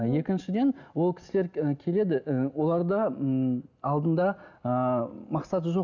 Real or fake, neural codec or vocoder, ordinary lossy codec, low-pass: real; none; none; 7.2 kHz